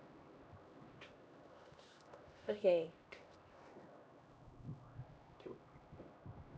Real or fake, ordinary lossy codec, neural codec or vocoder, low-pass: fake; none; codec, 16 kHz, 1 kbps, X-Codec, HuBERT features, trained on LibriSpeech; none